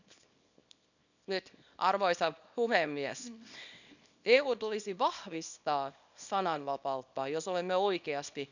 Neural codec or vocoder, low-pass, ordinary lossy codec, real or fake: codec, 24 kHz, 0.9 kbps, WavTokenizer, small release; 7.2 kHz; none; fake